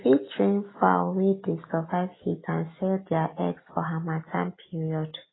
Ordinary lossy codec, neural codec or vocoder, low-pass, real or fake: AAC, 16 kbps; none; 7.2 kHz; real